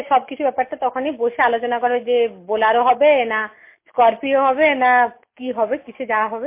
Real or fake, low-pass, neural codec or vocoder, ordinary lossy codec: real; 3.6 kHz; none; MP3, 24 kbps